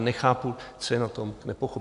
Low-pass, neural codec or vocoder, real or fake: 10.8 kHz; none; real